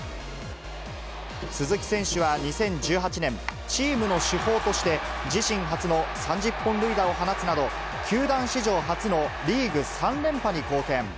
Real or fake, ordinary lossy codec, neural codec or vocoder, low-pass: real; none; none; none